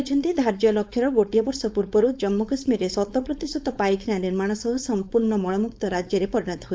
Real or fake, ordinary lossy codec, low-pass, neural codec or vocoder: fake; none; none; codec, 16 kHz, 4.8 kbps, FACodec